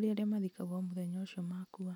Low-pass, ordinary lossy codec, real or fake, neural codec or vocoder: 19.8 kHz; none; real; none